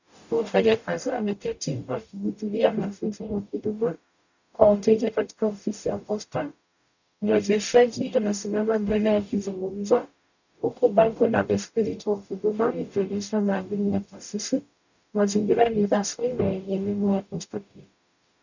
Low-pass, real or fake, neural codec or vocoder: 7.2 kHz; fake; codec, 44.1 kHz, 0.9 kbps, DAC